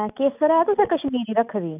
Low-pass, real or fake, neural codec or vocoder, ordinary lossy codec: 3.6 kHz; real; none; none